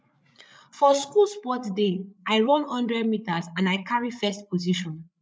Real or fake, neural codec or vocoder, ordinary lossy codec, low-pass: fake; codec, 16 kHz, 8 kbps, FreqCodec, larger model; none; none